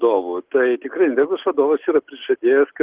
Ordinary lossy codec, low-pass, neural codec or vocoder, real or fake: Opus, 16 kbps; 3.6 kHz; none; real